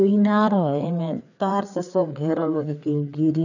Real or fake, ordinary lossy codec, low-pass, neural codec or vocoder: fake; none; 7.2 kHz; codec, 16 kHz, 4 kbps, FreqCodec, larger model